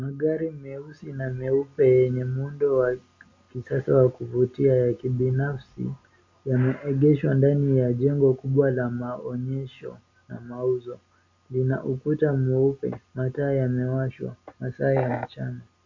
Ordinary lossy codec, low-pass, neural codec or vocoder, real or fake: MP3, 64 kbps; 7.2 kHz; none; real